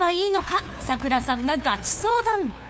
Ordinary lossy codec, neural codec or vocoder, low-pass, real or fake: none; codec, 16 kHz, 2 kbps, FunCodec, trained on LibriTTS, 25 frames a second; none; fake